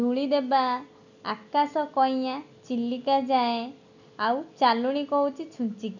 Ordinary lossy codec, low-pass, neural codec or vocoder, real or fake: none; 7.2 kHz; none; real